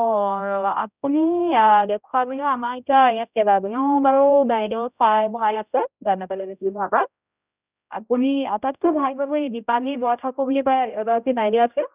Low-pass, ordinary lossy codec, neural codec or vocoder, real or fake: 3.6 kHz; none; codec, 16 kHz, 0.5 kbps, X-Codec, HuBERT features, trained on general audio; fake